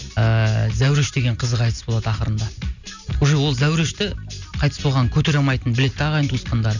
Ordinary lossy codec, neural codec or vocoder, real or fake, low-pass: none; none; real; 7.2 kHz